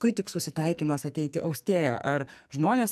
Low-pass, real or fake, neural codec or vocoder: 14.4 kHz; fake; codec, 44.1 kHz, 2.6 kbps, SNAC